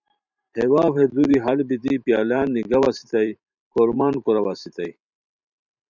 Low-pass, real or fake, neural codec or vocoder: 7.2 kHz; fake; vocoder, 44.1 kHz, 128 mel bands every 512 samples, BigVGAN v2